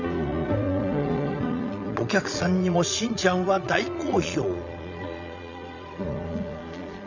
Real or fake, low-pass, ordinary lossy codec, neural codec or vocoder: fake; 7.2 kHz; none; vocoder, 22.05 kHz, 80 mel bands, Vocos